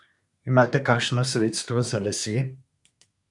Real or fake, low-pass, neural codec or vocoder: fake; 10.8 kHz; codec, 24 kHz, 1 kbps, SNAC